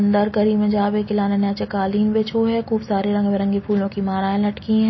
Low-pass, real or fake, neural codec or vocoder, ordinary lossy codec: 7.2 kHz; real; none; MP3, 24 kbps